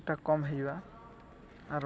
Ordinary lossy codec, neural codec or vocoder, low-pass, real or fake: none; none; none; real